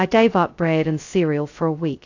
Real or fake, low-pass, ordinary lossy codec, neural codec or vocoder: fake; 7.2 kHz; AAC, 48 kbps; codec, 16 kHz, 0.2 kbps, FocalCodec